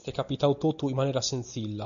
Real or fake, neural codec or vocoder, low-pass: real; none; 7.2 kHz